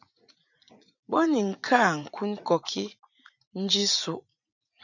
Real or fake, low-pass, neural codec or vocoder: fake; 7.2 kHz; vocoder, 44.1 kHz, 80 mel bands, Vocos